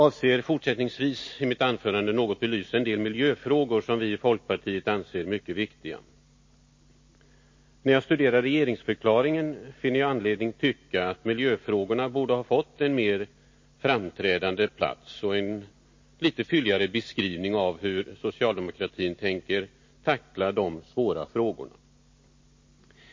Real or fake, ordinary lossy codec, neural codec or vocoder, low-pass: real; MP3, 32 kbps; none; 7.2 kHz